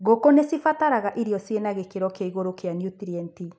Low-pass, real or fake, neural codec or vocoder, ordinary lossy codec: none; real; none; none